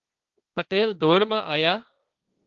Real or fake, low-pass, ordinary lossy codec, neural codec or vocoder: fake; 7.2 kHz; Opus, 32 kbps; codec, 16 kHz, 1.1 kbps, Voila-Tokenizer